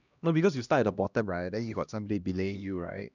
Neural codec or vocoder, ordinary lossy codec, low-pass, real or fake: codec, 16 kHz, 1 kbps, X-Codec, HuBERT features, trained on LibriSpeech; none; 7.2 kHz; fake